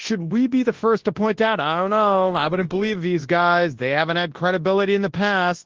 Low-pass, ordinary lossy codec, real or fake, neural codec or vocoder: 7.2 kHz; Opus, 16 kbps; fake; codec, 24 kHz, 0.9 kbps, WavTokenizer, large speech release